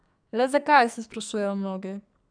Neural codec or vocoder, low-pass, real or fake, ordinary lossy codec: codec, 44.1 kHz, 2.6 kbps, SNAC; 9.9 kHz; fake; none